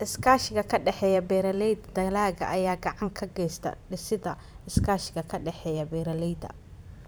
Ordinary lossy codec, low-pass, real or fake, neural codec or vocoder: none; none; fake; vocoder, 44.1 kHz, 128 mel bands every 512 samples, BigVGAN v2